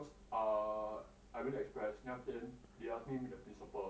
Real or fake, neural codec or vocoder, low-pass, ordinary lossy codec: real; none; none; none